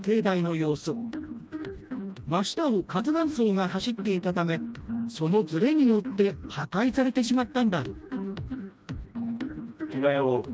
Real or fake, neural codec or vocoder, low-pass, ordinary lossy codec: fake; codec, 16 kHz, 1 kbps, FreqCodec, smaller model; none; none